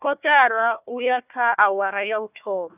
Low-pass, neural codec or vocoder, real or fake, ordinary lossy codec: 3.6 kHz; codec, 16 kHz, 1 kbps, FunCodec, trained on Chinese and English, 50 frames a second; fake; none